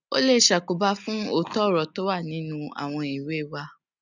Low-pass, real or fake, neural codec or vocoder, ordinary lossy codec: 7.2 kHz; real; none; none